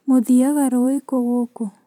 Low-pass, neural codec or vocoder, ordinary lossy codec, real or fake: 19.8 kHz; vocoder, 44.1 kHz, 128 mel bands every 512 samples, BigVGAN v2; none; fake